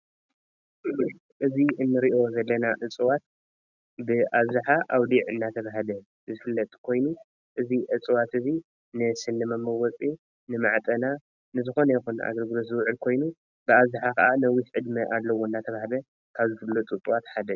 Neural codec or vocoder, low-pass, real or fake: none; 7.2 kHz; real